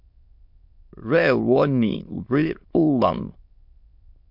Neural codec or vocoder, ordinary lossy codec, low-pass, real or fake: autoencoder, 22.05 kHz, a latent of 192 numbers a frame, VITS, trained on many speakers; MP3, 48 kbps; 5.4 kHz; fake